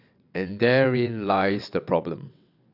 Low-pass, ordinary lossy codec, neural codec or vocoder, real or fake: 5.4 kHz; none; vocoder, 22.05 kHz, 80 mel bands, WaveNeXt; fake